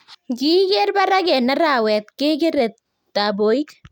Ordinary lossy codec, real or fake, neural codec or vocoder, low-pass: none; real; none; 19.8 kHz